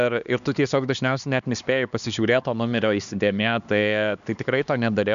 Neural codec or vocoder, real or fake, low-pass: codec, 16 kHz, 2 kbps, X-Codec, HuBERT features, trained on LibriSpeech; fake; 7.2 kHz